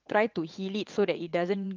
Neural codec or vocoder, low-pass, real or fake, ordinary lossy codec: vocoder, 22.05 kHz, 80 mel bands, WaveNeXt; 7.2 kHz; fake; Opus, 32 kbps